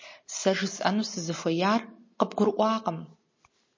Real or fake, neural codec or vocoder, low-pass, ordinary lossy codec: real; none; 7.2 kHz; MP3, 32 kbps